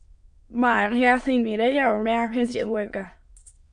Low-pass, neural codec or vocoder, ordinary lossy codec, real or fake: 9.9 kHz; autoencoder, 22.05 kHz, a latent of 192 numbers a frame, VITS, trained on many speakers; MP3, 64 kbps; fake